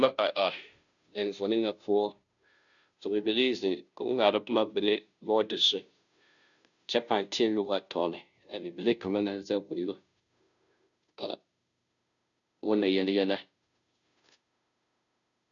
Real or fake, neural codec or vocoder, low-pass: fake; codec, 16 kHz, 0.5 kbps, FunCodec, trained on Chinese and English, 25 frames a second; 7.2 kHz